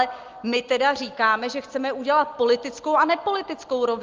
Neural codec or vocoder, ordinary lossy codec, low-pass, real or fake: none; Opus, 32 kbps; 7.2 kHz; real